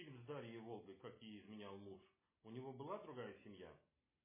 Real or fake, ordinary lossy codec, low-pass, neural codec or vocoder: real; MP3, 16 kbps; 3.6 kHz; none